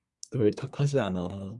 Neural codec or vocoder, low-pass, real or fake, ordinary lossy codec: codec, 24 kHz, 1 kbps, SNAC; 10.8 kHz; fake; Opus, 64 kbps